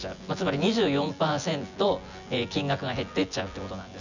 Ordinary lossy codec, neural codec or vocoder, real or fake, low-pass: none; vocoder, 24 kHz, 100 mel bands, Vocos; fake; 7.2 kHz